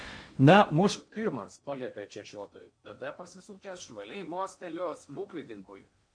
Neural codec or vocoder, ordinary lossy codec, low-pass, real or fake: codec, 16 kHz in and 24 kHz out, 0.8 kbps, FocalCodec, streaming, 65536 codes; MP3, 48 kbps; 9.9 kHz; fake